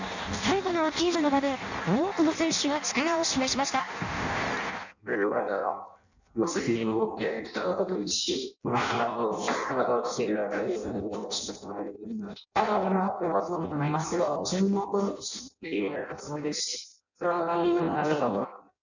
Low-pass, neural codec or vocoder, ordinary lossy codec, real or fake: 7.2 kHz; codec, 16 kHz in and 24 kHz out, 0.6 kbps, FireRedTTS-2 codec; none; fake